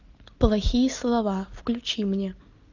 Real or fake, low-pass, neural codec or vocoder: real; 7.2 kHz; none